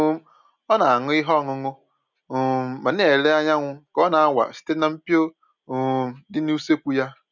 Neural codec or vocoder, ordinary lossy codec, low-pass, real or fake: none; none; 7.2 kHz; real